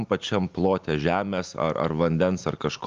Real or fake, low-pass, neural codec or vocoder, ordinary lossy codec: real; 7.2 kHz; none; Opus, 32 kbps